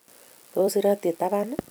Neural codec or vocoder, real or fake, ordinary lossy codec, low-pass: vocoder, 44.1 kHz, 128 mel bands every 256 samples, BigVGAN v2; fake; none; none